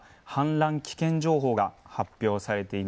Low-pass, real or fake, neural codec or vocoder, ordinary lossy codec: none; real; none; none